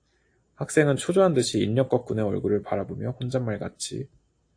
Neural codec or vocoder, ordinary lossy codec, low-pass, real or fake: none; AAC, 48 kbps; 9.9 kHz; real